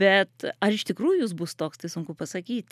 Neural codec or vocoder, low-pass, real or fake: none; 14.4 kHz; real